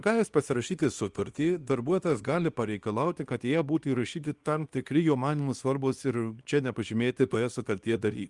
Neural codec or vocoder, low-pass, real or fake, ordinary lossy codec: codec, 24 kHz, 0.9 kbps, WavTokenizer, small release; 10.8 kHz; fake; Opus, 32 kbps